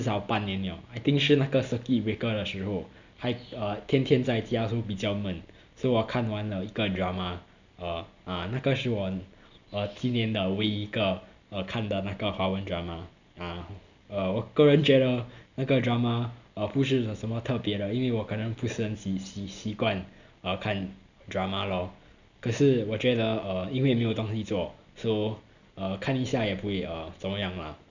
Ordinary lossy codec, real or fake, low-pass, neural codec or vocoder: none; real; 7.2 kHz; none